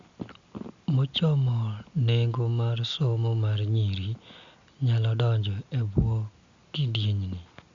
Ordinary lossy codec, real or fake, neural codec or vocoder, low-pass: Opus, 64 kbps; real; none; 7.2 kHz